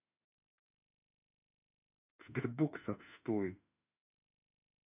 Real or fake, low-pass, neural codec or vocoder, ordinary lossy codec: fake; 3.6 kHz; autoencoder, 48 kHz, 32 numbers a frame, DAC-VAE, trained on Japanese speech; none